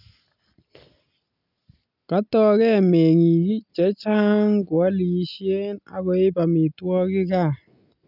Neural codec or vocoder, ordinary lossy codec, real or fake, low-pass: none; none; real; 5.4 kHz